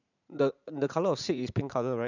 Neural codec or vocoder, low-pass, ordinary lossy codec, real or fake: vocoder, 44.1 kHz, 80 mel bands, Vocos; 7.2 kHz; none; fake